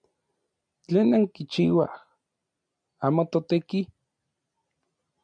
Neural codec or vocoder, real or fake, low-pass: none; real; 9.9 kHz